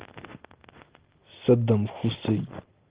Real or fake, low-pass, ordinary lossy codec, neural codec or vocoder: real; 3.6 kHz; Opus, 16 kbps; none